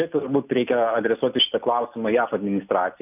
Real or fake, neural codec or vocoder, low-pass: real; none; 3.6 kHz